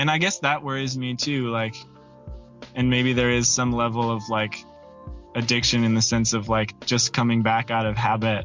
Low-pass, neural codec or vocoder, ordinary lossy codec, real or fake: 7.2 kHz; none; MP3, 64 kbps; real